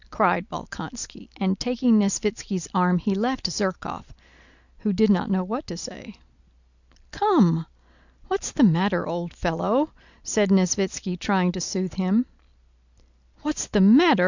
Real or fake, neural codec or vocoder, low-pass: real; none; 7.2 kHz